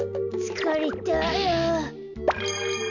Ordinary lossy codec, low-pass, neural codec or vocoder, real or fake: none; 7.2 kHz; none; real